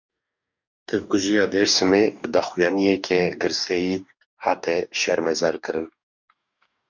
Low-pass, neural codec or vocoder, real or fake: 7.2 kHz; codec, 44.1 kHz, 2.6 kbps, DAC; fake